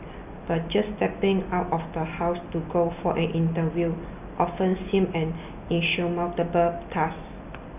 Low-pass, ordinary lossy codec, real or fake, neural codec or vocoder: 3.6 kHz; none; real; none